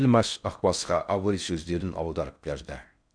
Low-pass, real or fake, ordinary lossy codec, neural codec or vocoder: 9.9 kHz; fake; none; codec, 16 kHz in and 24 kHz out, 0.6 kbps, FocalCodec, streaming, 4096 codes